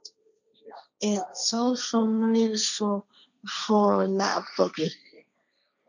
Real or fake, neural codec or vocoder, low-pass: fake; codec, 16 kHz, 1.1 kbps, Voila-Tokenizer; 7.2 kHz